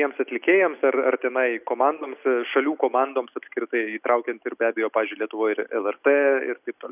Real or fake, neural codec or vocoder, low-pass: real; none; 3.6 kHz